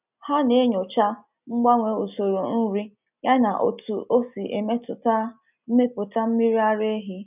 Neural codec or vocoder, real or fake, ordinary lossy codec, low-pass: none; real; none; 3.6 kHz